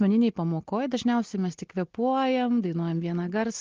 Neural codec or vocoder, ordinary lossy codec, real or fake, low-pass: none; Opus, 16 kbps; real; 7.2 kHz